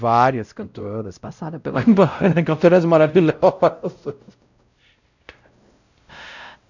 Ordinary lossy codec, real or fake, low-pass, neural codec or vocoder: none; fake; 7.2 kHz; codec, 16 kHz, 0.5 kbps, X-Codec, WavLM features, trained on Multilingual LibriSpeech